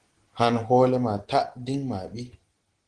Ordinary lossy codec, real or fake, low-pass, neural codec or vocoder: Opus, 16 kbps; real; 9.9 kHz; none